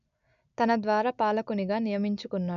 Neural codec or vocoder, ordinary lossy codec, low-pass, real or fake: none; none; 7.2 kHz; real